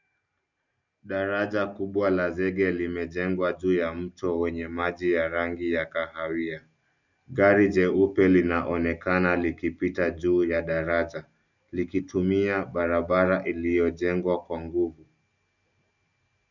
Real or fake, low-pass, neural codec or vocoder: real; 7.2 kHz; none